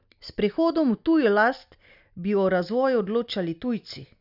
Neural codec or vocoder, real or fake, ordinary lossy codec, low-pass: none; real; none; 5.4 kHz